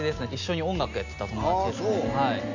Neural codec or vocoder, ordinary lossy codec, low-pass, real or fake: none; none; 7.2 kHz; real